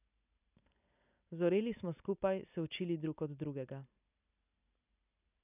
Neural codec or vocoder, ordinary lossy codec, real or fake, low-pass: none; none; real; 3.6 kHz